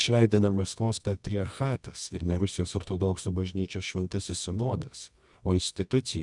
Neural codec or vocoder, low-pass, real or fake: codec, 24 kHz, 0.9 kbps, WavTokenizer, medium music audio release; 10.8 kHz; fake